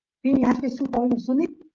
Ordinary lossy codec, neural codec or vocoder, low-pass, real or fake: Opus, 24 kbps; codec, 16 kHz, 16 kbps, FreqCodec, smaller model; 7.2 kHz; fake